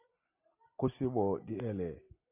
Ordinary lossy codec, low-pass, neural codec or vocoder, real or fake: AAC, 32 kbps; 3.6 kHz; none; real